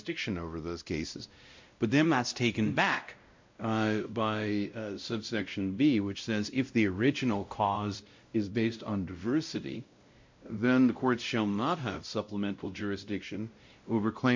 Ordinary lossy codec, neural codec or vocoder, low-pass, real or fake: MP3, 48 kbps; codec, 16 kHz, 0.5 kbps, X-Codec, WavLM features, trained on Multilingual LibriSpeech; 7.2 kHz; fake